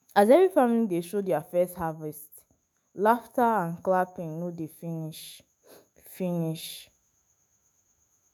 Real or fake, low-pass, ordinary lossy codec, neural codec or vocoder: fake; none; none; autoencoder, 48 kHz, 128 numbers a frame, DAC-VAE, trained on Japanese speech